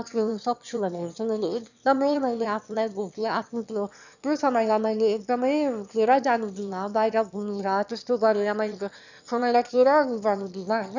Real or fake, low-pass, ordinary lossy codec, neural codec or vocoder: fake; 7.2 kHz; none; autoencoder, 22.05 kHz, a latent of 192 numbers a frame, VITS, trained on one speaker